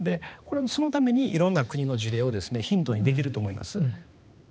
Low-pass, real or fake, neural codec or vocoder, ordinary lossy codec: none; fake; codec, 16 kHz, 2 kbps, X-Codec, HuBERT features, trained on balanced general audio; none